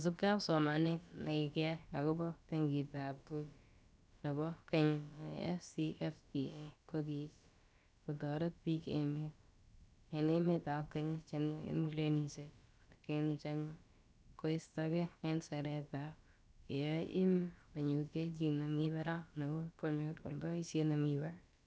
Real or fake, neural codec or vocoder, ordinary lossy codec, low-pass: fake; codec, 16 kHz, about 1 kbps, DyCAST, with the encoder's durations; none; none